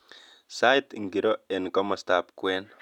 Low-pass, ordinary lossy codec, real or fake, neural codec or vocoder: 19.8 kHz; none; real; none